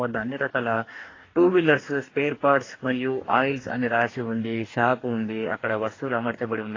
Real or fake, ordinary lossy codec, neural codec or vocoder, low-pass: fake; AAC, 32 kbps; codec, 44.1 kHz, 2.6 kbps, DAC; 7.2 kHz